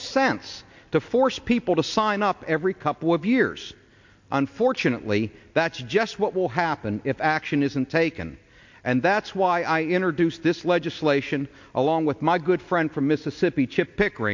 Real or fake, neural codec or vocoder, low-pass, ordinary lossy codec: real; none; 7.2 kHz; MP3, 48 kbps